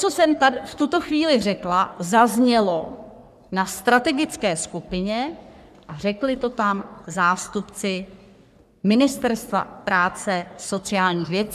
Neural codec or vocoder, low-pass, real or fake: codec, 44.1 kHz, 3.4 kbps, Pupu-Codec; 14.4 kHz; fake